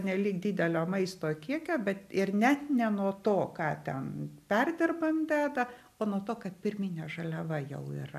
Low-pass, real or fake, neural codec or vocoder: 14.4 kHz; real; none